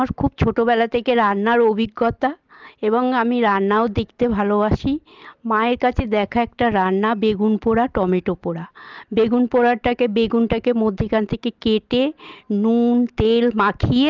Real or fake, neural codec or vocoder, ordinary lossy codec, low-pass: real; none; Opus, 32 kbps; 7.2 kHz